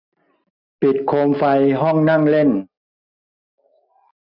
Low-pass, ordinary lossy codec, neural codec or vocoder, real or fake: 5.4 kHz; none; none; real